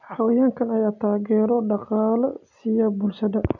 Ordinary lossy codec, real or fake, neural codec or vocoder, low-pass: none; real; none; 7.2 kHz